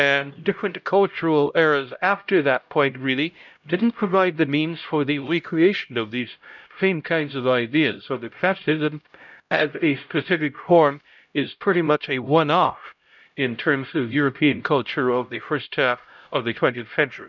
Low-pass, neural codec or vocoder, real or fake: 7.2 kHz; codec, 16 kHz, 0.5 kbps, X-Codec, HuBERT features, trained on LibriSpeech; fake